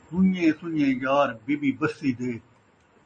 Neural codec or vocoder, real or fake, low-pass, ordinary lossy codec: none; real; 10.8 kHz; MP3, 32 kbps